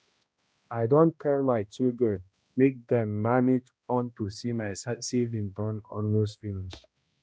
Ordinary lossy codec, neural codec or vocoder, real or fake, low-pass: none; codec, 16 kHz, 1 kbps, X-Codec, HuBERT features, trained on balanced general audio; fake; none